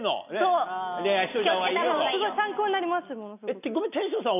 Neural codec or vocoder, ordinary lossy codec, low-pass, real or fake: none; none; 3.6 kHz; real